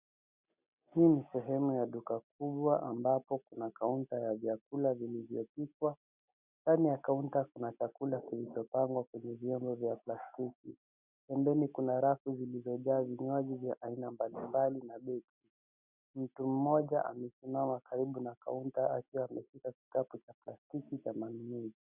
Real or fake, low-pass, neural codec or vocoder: real; 3.6 kHz; none